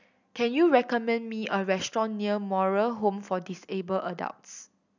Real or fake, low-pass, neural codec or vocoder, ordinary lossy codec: real; 7.2 kHz; none; none